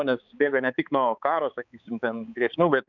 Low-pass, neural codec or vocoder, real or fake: 7.2 kHz; codec, 16 kHz, 4 kbps, X-Codec, HuBERT features, trained on balanced general audio; fake